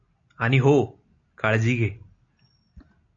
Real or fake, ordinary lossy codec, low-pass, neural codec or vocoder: real; AAC, 48 kbps; 7.2 kHz; none